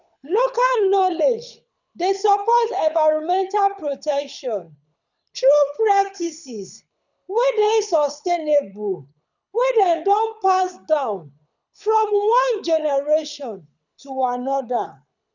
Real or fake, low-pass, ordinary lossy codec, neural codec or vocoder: fake; 7.2 kHz; none; codec, 24 kHz, 6 kbps, HILCodec